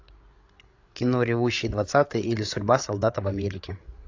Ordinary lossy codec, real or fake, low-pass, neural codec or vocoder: AAC, 48 kbps; fake; 7.2 kHz; codec, 16 kHz, 8 kbps, FreqCodec, larger model